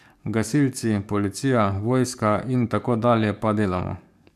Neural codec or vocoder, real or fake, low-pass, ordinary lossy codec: codec, 44.1 kHz, 7.8 kbps, DAC; fake; 14.4 kHz; MP3, 96 kbps